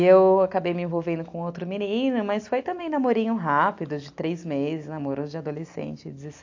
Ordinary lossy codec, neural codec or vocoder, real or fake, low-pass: AAC, 48 kbps; none; real; 7.2 kHz